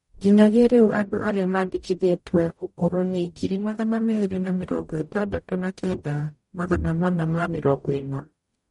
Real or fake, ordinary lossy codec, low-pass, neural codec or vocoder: fake; MP3, 48 kbps; 19.8 kHz; codec, 44.1 kHz, 0.9 kbps, DAC